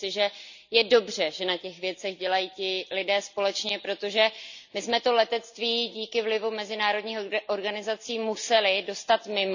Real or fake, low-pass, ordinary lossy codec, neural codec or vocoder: real; 7.2 kHz; none; none